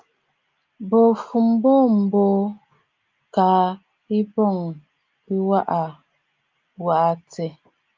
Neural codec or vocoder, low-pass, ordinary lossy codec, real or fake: none; 7.2 kHz; Opus, 24 kbps; real